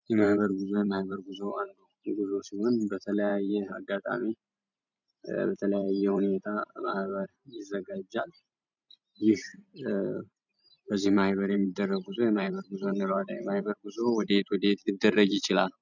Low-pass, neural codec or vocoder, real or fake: 7.2 kHz; vocoder, 44.1 kHz, 128 mel bands every 512 samples, BigVGAN v2; fake